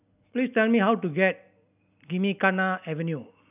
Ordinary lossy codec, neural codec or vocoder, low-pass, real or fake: none; none; 3.6 kHz; real